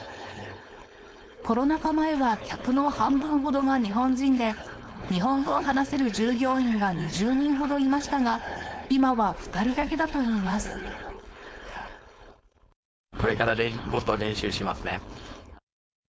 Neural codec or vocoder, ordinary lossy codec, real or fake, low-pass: codec, 16 kHz, 4.8 kbps, FACodec; none; fake; none